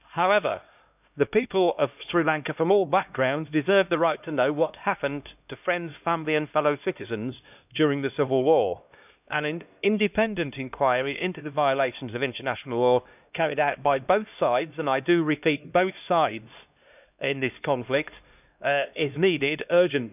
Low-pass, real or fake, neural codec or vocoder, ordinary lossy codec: 3.6 kHz; fake; codec, 16 kHz, 1 kbps, X-Codec, HuBERT features, trained on LibriSpeech; none